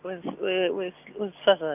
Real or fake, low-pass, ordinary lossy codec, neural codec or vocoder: real; 3.6 kHz; none; none